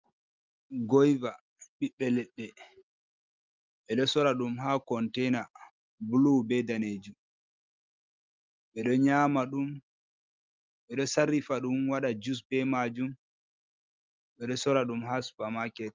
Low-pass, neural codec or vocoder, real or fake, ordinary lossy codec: 7.2 kHz; none; real; Opus, 32 kbps